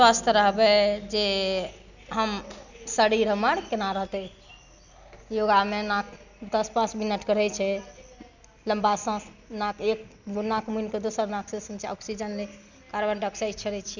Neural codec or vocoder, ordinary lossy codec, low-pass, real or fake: none; none; 7.2 kHz; real